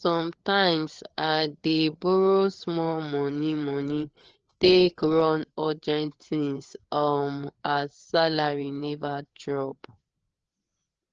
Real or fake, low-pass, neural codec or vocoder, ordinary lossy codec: fake; 7.2 kHz; codec, 16 kHz, 4 kbps, FreqCodec, larger model; Opus, 16 kbps